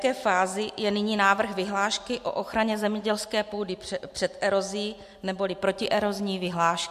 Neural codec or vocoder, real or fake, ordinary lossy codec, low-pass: none; real; MP3, 64 kbps; 14.4 kHz